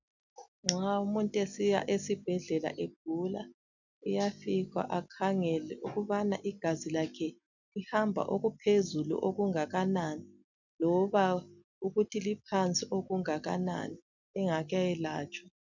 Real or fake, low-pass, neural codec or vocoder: real; 7.2 kHz; none